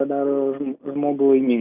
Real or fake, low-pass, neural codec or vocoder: real; 3.6 kHz; none